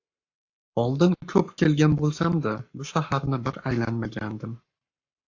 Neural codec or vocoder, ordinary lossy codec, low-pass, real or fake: codec, 44.1 kHz, 7.8 kbps, Pupu-Codec; AAC, 48 kbps; 7.2 kHz; fake